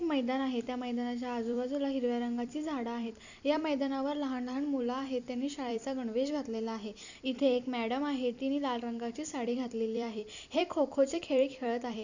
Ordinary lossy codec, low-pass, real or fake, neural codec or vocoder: none; 7.2 kHz; fake; vocoder, 44.1 kHz, 128 mel bands every 256 samples, BigVGAN v2